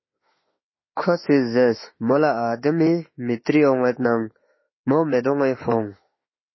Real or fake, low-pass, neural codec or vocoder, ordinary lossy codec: fake; 7.2 kHz; autoencoder, 48 kHz, 32 numbers a frame, DAC-VAE, trained on Japanese speech; MP3, 24 kbps